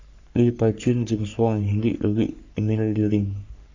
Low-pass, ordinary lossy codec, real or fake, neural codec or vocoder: 7.2 kHz; AAC, 48 kbps; fake; codec, 44.1 kHz, 3.4 kbps, Pupu-Codec